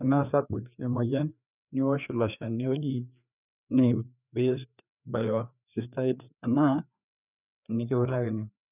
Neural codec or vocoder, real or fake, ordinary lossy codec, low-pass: codec, 16 kHz, 2 kbps, FreqCodec, larger model; fake; none; 3.6 kHz